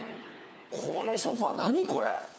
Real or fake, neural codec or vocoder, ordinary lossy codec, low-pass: fake; codec, 16 kHz, 4 kbps, FunCodec, trained on LibriTTS, 50 frames a second; none; none